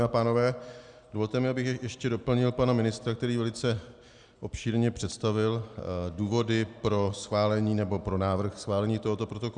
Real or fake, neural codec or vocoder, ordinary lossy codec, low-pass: real; none; Opus, 64 kbps; 9.9 kHz